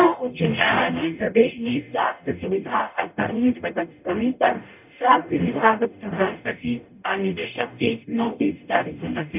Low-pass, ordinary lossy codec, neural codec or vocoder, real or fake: 3.6 kHz; none; codec, 44.1 kHz, 0.9 kbps, DAC; fake